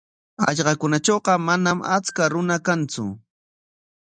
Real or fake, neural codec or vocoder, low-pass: real; none; 9.9 kHz